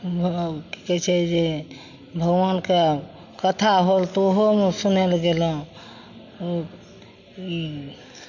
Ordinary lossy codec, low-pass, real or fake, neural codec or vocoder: none; 7.2 kHz; real; none